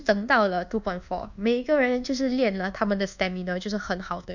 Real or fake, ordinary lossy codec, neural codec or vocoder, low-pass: fake; none; codec, 24 kHz, 1.2 kbps, DualCodec; 7.2 kHz